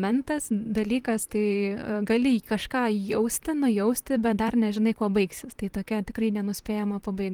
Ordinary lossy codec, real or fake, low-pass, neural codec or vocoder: Opus, 24 kbps; fake; 19.8 kHz; vocoder, 44.1 kHz, 128 mel bands, Pupu-Vocoder